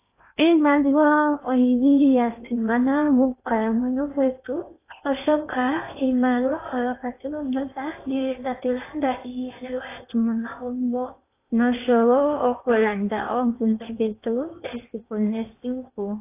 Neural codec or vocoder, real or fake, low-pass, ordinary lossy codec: codec, 16 kHz in and 24 kHz out, 0.8 kbps, FocalCodec, streaming, 65536 codes; fake; 3.6 kHz; AAC, 24 kbps